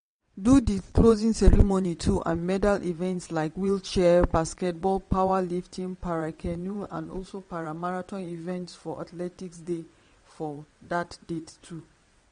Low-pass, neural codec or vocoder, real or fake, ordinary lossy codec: 9.9 kHz; vocoder, 22.05 kHz, 80 mel bands, WaveNeXt; fake; MP3, 48 kbps